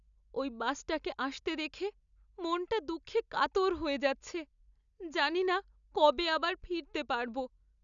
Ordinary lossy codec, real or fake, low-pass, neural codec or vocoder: none; real; 7.2 kHz; none